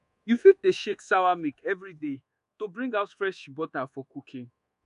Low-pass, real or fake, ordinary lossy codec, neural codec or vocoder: 10.8 kHz; fake; none; codec, 24 kHz, 1.2 kbps, DualCodec